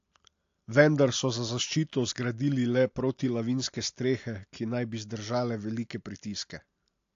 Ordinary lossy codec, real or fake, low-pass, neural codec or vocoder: AAC, 48 kbps; real; 7.2 kHz; none